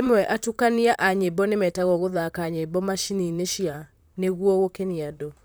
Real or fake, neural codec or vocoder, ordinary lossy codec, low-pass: fake; vocoder, 44.1 kHz, 128 mel bands, Pupu-Vocoder; none; none